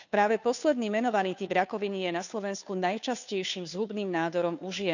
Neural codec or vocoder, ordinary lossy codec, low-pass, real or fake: codec, 16 kHz, 2 kbps, FunCodec, trained on Chinese and English, 25 frames a second; none; 7.2 kHz; fake